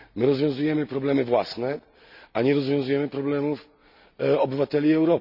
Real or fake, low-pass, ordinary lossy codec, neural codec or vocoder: real; 5.4 kHz; none; none